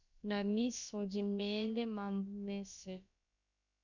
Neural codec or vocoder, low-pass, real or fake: codec, 16 kHz, about 1 kbps, DyCAST, with the encoder's durations; 7.2 kHz; fake